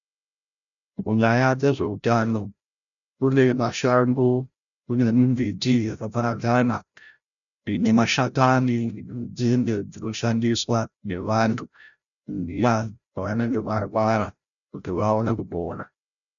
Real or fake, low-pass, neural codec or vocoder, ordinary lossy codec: fake; 7.2 kHz; codec, 16 kHz, 0.5 kbps, FreqCodec, larger model; MP3, 96 kbps